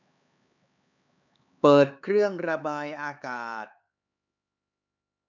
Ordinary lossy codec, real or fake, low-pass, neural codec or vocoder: none; fake; 7.2 kHz; codec, 16 kHz, 4 kbps, X-Codec, HuBERT features, trained on LibriSpeech